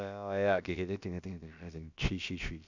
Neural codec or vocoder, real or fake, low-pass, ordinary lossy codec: codec, 16 kHz, about 1 kbps, DyCAST, with the encoder's durations; fake; 7.2 kHz; none